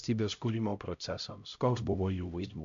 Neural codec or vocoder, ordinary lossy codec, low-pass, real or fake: codec, 16 kHz, 0.5 kbps, X-Codec, HuBERT features, trained on LibriSpeech; MP3, 64 kbps; 7.2 kHz; fake